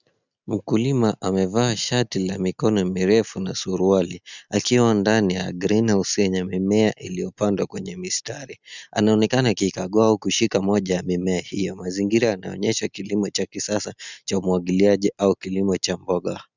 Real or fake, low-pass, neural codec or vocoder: real; 7.2 kHz; none